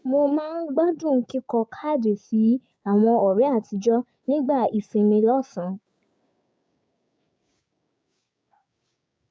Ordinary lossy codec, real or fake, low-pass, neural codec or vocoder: none; fake; none; codec, 16 kHz, 6 kbps, DAC